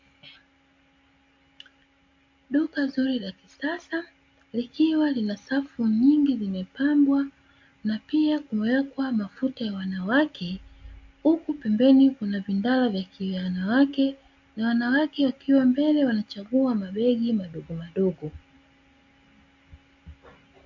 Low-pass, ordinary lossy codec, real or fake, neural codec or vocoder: 7.2 kHz; MP3, 48 kbps; real; none